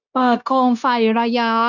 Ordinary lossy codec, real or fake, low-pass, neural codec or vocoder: none; fake; 7.2 kHz; codec, 16 kHz, 0.9 kbps, LongCat-Audio-Codec